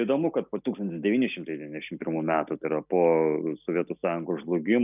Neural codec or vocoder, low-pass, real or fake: none; 3.6 kHz; real